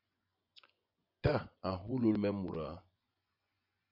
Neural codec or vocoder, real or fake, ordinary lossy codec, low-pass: vocoder, 24 kHz, 100 mel bands, Vocos; fake; AAC, 48 kbps; 5.4 kHz